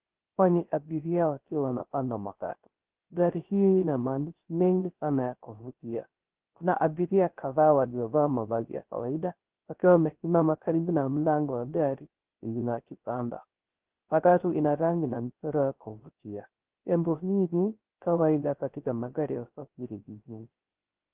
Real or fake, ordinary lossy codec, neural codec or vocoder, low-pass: fake; Opus, 16 kbps; codec, 16 kHz, 0.3 kbps, FocalCodec; 3.6 kHz